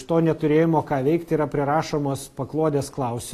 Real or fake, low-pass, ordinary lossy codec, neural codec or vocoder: real; 14.4 kHz; AAC, 48 kbps; none